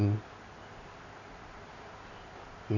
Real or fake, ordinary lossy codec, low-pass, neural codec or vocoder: real; none; 7.2 kHz; none